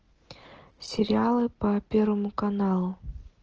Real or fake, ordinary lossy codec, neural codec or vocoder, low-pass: real; Opus, 16 kbps; none; 7.2 kHz